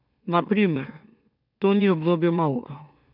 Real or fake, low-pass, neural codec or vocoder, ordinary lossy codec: fake; 5.4 kHz; autoencoder, 44.1 kHz, a latent of 192 numbers a frame, MeloTTS; none